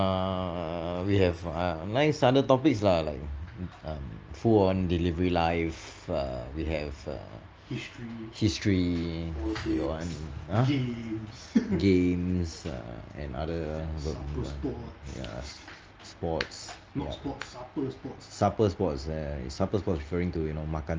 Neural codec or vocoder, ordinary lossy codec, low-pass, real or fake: none; Opus, 24 kbps; 7.2 kHz; real